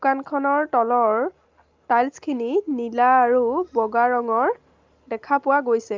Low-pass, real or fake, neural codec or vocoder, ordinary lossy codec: 7.2 kHz; real; none; Opus, 24 kbps